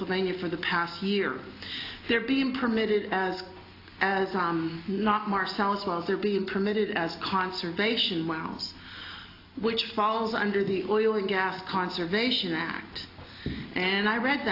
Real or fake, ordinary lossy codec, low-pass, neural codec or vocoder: real; AAC, 32 kbps; 5.4 kHz; none